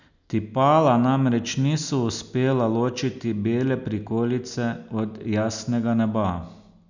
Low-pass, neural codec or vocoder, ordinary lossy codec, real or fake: 7.2 kHz; none; none; real